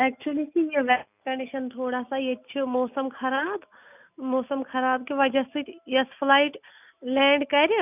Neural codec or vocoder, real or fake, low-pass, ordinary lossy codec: none; real; 3.6 kHz; none